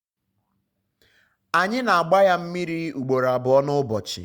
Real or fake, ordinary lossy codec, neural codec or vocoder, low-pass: real; Opus, 64 kbps; none; 19.8 kHz